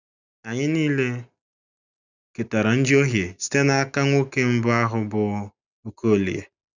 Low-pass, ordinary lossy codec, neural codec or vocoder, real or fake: 7.2 kHz; none; none; real